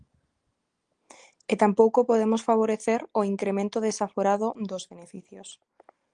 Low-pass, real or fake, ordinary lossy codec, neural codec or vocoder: 9.9 kHz; real; Opus, 24 kbps; none